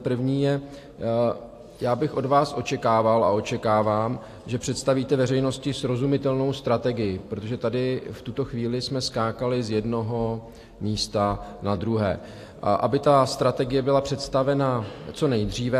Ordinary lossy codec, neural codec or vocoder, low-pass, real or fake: AAC, 64 kbps; none; 14.4 kHz; real